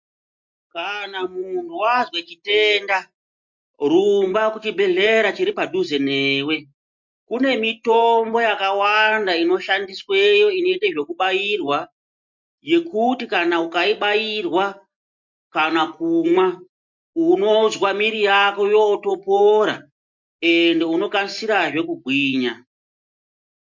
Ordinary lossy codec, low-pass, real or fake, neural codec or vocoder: MP3, 48 kbps; 7.2 kHz; real; none